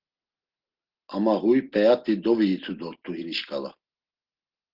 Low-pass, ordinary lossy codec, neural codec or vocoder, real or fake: 5.4 kHz; Opus, 16 kbps; none; real